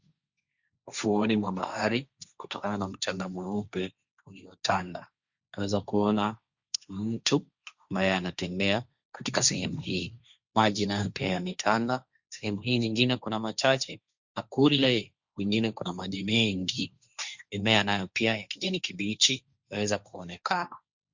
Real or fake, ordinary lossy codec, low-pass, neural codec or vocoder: fake; Opus, 64 kbps; 7.2 kHz; codec, 16 kHz, 1.1 kbps, Voila-Tokenizer